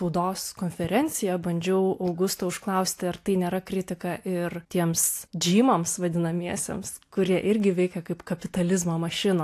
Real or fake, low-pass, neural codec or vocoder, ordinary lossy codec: real; 14.4 kHz; none; AAC, 64 kbps